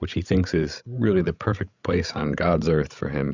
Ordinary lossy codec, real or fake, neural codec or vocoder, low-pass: Opus, 64 kbps; fake; codec, 16 kHz, 16 kbps, FreqCodec, larger model; 7.2 kHz